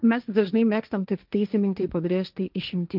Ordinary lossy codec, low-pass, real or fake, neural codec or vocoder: Opus, 24 kbps; 5.4 kHz; fake; codec, 16 kHz, 1.1 kbps, Voila-Tokenizer